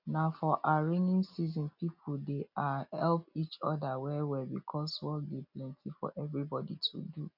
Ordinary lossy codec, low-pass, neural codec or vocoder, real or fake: none; 5.4 kHz; none; real